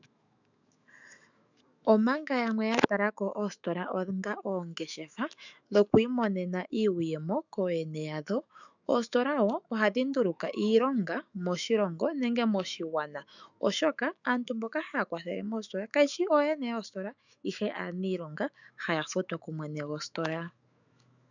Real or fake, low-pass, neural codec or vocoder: fake; 7.2 kHz; autoencoder, 48 kHz, 128 numbers a frame, DAC-VAE, trained on Japanese speech